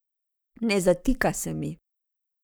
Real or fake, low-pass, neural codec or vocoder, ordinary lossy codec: fake; none; codec, 44.1 kHz, 7.8 kbps, Pupu-Codec; none